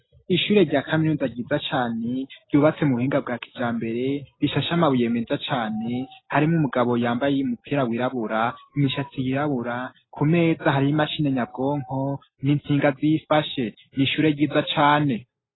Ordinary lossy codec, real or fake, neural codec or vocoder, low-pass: AAC, 16 kbps; real; none; 7.2 kHz